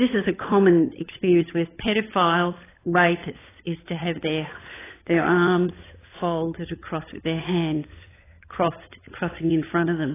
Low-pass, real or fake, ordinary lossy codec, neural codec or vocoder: 3.6 kHz; fake; AAC, 16 kbps; codec, 16 kHz, 16 kbps, FunCodec, trained on LibriTTS, 50 frames a second